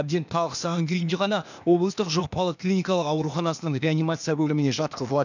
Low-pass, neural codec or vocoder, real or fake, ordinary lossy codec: 7.2 kHz; codec, 16 kHz, 0.8 kbps, ZipCodec; fake; none